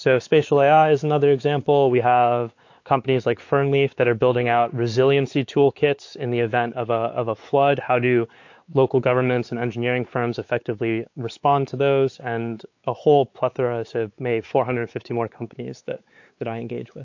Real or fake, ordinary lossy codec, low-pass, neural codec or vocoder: fake; AAC, 48 kbps; 7.2 kHz; codec, 16 kHz, 4 kbps, X-Codec, WavLM features, trained on Multilingual LibriSpeech